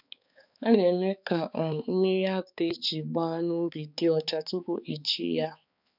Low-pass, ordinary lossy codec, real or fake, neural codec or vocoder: 5.4 kHz; none; fake; codec, 16 kHz, 4 kbps, X-Codec, HuBERT features, trained on balanced general audio